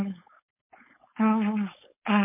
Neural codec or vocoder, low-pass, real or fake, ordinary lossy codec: codec, 16 kHz, 4.8 kbps, FACodec; 3.6 kHz; fake; MP3, 24 kbps